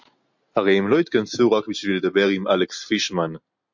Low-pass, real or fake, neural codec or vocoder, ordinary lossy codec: 7.2 kHz; real; none; MP3, 48 kbps